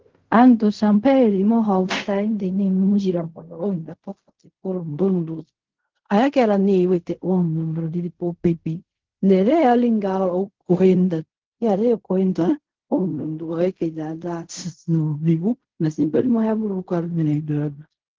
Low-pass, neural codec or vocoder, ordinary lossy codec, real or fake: 7.2 kHz; codec, 16 kHz in and 24 kHz out, 0.4 kbps, LongCat-Audio-Codec, fine tuned four codebook decoder; Opus, 16 kbps; fake